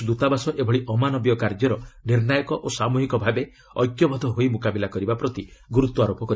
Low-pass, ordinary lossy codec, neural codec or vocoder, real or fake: none; none; none; real